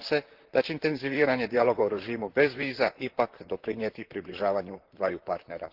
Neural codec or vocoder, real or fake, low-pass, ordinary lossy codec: vocoder, 44.1 kHz, 128 mel bands, Pupu-Vocoder; fake; 5.4 kHz; Opus, 16 kbps